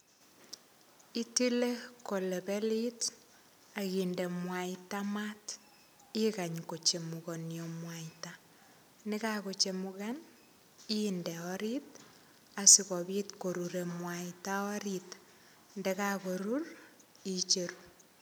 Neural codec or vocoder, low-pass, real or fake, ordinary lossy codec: none; none; real; none